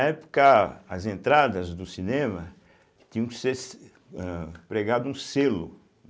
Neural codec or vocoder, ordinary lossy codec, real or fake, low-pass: none; none; real; none